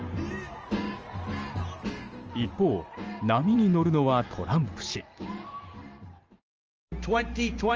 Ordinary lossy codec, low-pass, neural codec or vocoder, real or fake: Opus, 24 kbps; 7.2 kHz; vocoder, 44.1 kHz, 128 mel bands every 512 samples, BigVGAN v2; fake